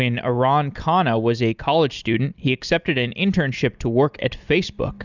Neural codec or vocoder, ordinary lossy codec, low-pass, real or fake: none; Opus, 64 kbps; 7.2 kHz; real